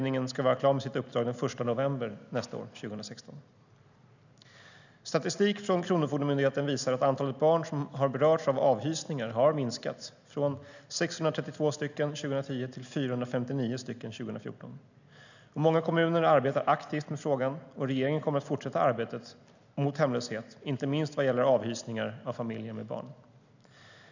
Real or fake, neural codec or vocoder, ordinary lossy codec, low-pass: real; none; none; 7.2 kHz